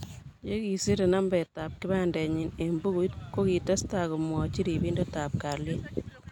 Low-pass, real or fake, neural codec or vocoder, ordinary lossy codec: 19.8 kHz; real; none; none